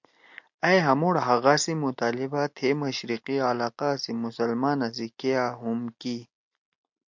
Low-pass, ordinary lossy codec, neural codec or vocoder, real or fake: 7.2 kHz; MP3, 48 kbps; none; real